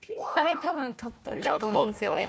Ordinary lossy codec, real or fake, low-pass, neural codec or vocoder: none; fake; none; codec, 16 kHz, 1 kbps, FunCodec, trained on Chinese and English, 50 frames a second